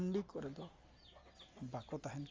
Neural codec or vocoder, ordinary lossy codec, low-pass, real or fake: none; Opus, 32 kbps; 7.2 kHz; real